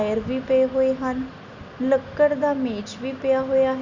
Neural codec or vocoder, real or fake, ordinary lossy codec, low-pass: none; real; none; 7.2 kHz